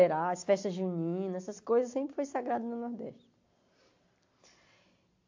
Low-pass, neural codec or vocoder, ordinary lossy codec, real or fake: 7.2 kHz; none; AAC, 48 kbps; real